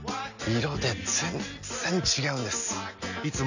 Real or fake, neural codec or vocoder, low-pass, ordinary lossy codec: real; none; 7.2 kHz; none